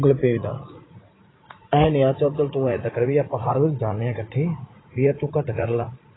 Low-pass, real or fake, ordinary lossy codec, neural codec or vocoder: 7.2 kHz; fake; AAC, 16 kbps; vocoder, 44.1 kHz, 80 mel bands, Vocos